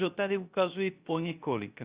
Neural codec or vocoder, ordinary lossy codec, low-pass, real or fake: codec, 16 kHz, 0.3 kbps, FocalCodec; Opus, 64 kbps; 3.6 kHz; fake